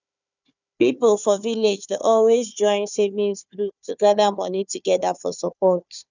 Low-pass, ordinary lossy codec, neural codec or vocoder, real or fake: 7.2 kHz; none; codec, 16 kHz, 4 kbps, FunCodec, trained on Chinese and English, 50 frames a second; fake